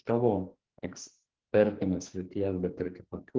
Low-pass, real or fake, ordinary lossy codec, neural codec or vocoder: 7.2 kHz; fake; Opus, 16 kbps; codec, 44.1 kHz, 2.6 kbps, SNAC